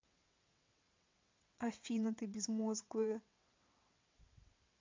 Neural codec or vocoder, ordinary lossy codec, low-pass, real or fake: vocoder, 22.05 kHz, 80 mel bands, WaveNeXt; MP3, 64 kbps; 7.2 kHz; fake